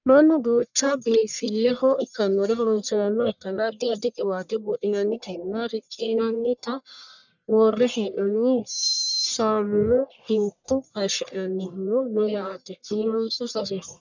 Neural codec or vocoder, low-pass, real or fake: codec, 44.1 kHz, 1.7 kbps, Pupu-Codec; 7.2 kHz; fake